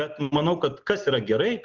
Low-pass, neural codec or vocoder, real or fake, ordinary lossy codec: 7.2 kHz; none; real; Opus, 16 kbps